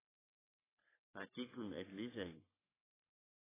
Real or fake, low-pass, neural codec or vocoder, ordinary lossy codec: fake; 3.6 kHz; codec, 44.1 kHz, 3.4 kbps, Pupu-Codec; AAC, 16 kbps